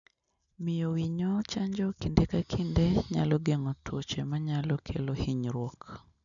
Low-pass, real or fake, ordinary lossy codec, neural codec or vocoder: 7.2 kHz; real; none; none